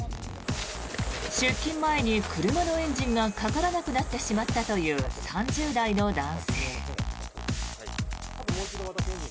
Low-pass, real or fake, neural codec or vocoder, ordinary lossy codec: none; real; none; none